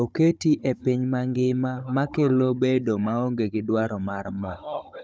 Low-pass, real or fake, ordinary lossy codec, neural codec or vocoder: none; fake; none; codec, 16 kHz, 16 kbps, FunCodec, trained on Chinese and English, 50 frames a second